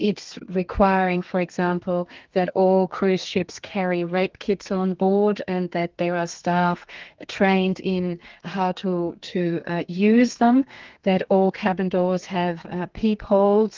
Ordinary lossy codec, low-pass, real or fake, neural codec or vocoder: Opus, 32 kbps; 7.2 kHz; fake; codec, 32 kHz, 1.9 kbps, SNAC